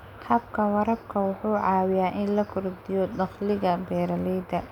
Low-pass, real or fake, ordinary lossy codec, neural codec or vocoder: 19.8 kHz; real; none; none